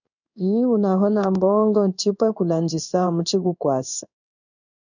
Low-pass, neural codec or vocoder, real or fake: 7.2 kHz; codec, 16 kHz in and 24 kHz out, 1 kbps, XY-Tokenizer; fake